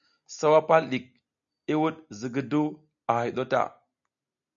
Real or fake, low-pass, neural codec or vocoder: real; 7.2 kHz; none